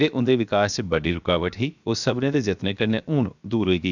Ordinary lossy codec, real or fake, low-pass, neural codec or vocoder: none; fake; 7.2 kHz; codec, 16 kHz, 0.7 kbps, FocalCodec